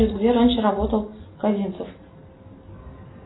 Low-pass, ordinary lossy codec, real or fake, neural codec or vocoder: 7.2 kHz; AAC, 16 kbps; real; none